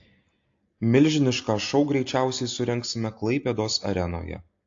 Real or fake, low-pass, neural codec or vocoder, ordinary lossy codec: real; 7.2 kHz; none; AAC, 48 kbps